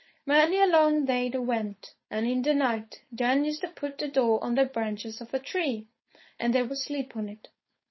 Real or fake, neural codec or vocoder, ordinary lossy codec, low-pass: fake; codec, 16 kHz, 4.8 kbps, FACodec; MP3, 24 kbps; 7.2 kHz